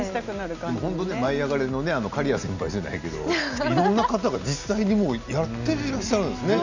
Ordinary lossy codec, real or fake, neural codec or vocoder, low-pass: none; real; none; 7.2 kHz